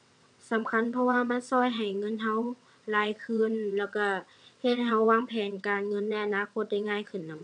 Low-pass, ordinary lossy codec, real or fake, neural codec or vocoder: 9.9 kHz; none; fake; vocoder, 22.05 kHz, 80 mel bands, WaveNeXt